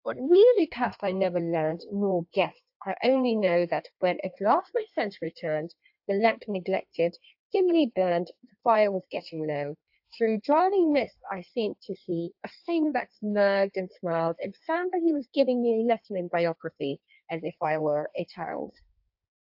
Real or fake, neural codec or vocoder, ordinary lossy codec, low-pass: fake; codec, 16 kHz in and 24 kHz out, 1.1 kbps, FireRedTTS-2 codec; AAC, 48 kbps; 5.4 kHz